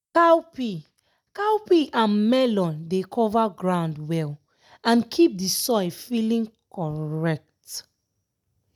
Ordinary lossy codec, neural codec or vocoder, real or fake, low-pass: none; none; real; 19.8 kHz